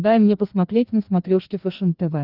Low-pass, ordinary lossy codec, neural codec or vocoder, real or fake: 5.4 kHz; Opus, 32 kbps; codec, 16 kHz, 2 kbps, FreqCodec, larger model; fake